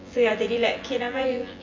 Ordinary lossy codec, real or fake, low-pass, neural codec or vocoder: MP3, 48 kbps; fake; 7.2 kHz; vocoder, 24 kHz, 100 mel bands, Vocos